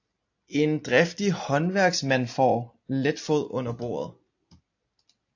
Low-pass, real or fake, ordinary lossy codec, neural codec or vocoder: 7.2 kHz; real; AAC, 48 kbps; none